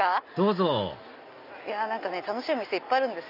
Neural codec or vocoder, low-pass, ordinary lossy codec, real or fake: none; 5.4 kHz; none; real